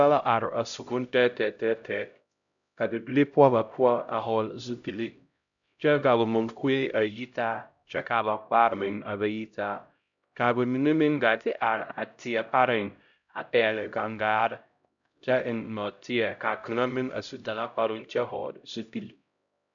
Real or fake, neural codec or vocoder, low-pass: fake; codec, 16 kHz, 0.5 kbps, X-Codec, HuBERT features, trained on LibriSpeech; 7.2 kHz